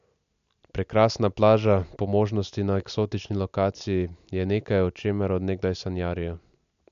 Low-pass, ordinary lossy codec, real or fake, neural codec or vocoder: 7.2 kHz; AAC, 96 kbps; real; none